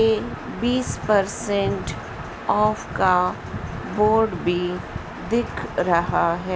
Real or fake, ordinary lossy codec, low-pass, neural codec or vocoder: real; none; none; none